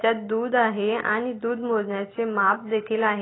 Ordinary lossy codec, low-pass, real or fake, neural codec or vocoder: AAC, 16 kbps; 7.2 kHz; real; none